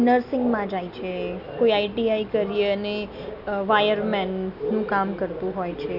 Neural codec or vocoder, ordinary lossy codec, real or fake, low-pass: none; none; real; 5.4 kHz